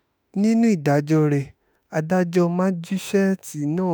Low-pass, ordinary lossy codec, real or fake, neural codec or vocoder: none; none; fake; autoencoder, 48 kHz, 32 numbers a frame, DAC-VAE, trained on Japanese speech